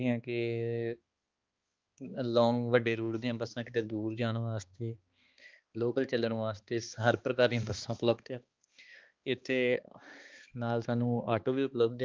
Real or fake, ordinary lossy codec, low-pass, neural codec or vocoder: fake; Opus, 32 kbps; 7.2 kHz; codec, 16 kHz, 2 kbps, X-Codec, HuBERT features, trained on balanced general audio